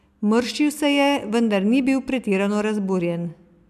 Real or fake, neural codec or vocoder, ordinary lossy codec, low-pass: real; none; none; 14.4 kHz